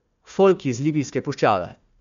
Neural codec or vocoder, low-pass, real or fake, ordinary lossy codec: codec, 16 kHz, 1 kbps, FunCodec, trained on Chinese and English, 50 frames a second; 7.2 kHz; fake; MP3, 64 kbps